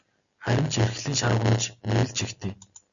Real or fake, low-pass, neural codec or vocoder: real; 7.2 kHz; none